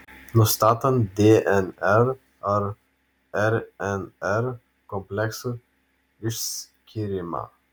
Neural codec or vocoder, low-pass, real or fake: none; 19.8 kHz; real